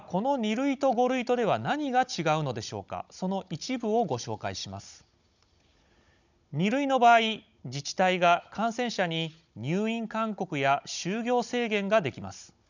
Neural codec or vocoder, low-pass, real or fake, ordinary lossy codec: codec, 16 kHz, 16 kbps, FunCodec, trained on Chinese and English, 50 frames a second; 7.2 kHz; fake; none